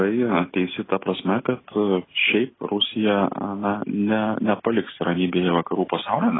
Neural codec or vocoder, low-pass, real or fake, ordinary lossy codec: none; 7.2 kHz; real; AAC, 16 kbps